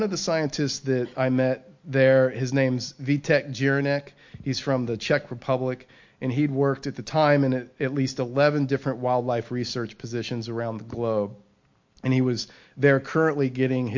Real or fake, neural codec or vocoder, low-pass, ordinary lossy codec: real; none; 7.2 kHz; MP3, 48 kbps